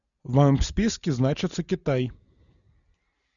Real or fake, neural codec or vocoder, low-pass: real; none; 7.2 kHz